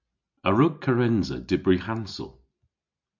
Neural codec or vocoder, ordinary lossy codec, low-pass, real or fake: none; MP3, 64 kbps; 7.2 kHz; real